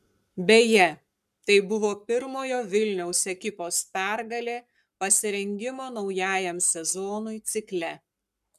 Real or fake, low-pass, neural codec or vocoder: fake; 14.4 kHz; codec, 44.1 kHz, 7.8 kbps, Pupu-Codec